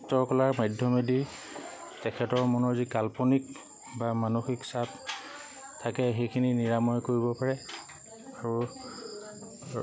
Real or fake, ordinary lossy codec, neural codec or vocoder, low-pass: real; none; none; none